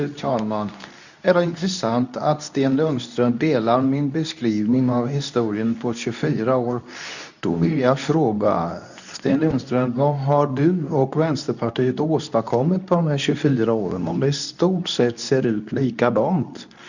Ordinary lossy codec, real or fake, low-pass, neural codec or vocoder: none; fake; 7.2 kHz; codec, 24 kHz, 0.9 kbps, WavTokenizer, medium speech release version 2